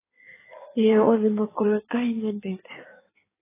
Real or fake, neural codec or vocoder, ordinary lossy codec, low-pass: fake; codec, 16 kHz in and 24 kHz out, 1.1 kbps, FireRedTTS-2 codec; AAC, 16 kbps; 3.6 kHz